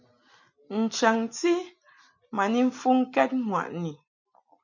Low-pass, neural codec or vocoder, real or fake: 7.2 kHz; none; real